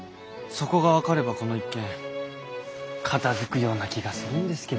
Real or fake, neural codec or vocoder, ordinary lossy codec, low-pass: real; none; none; none